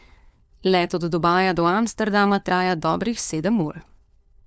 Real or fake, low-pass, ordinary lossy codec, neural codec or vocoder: fake; none; none; codec, 16 kHz, 4 kbps, FunCodec, trained on LibriTTS, 50 frames a second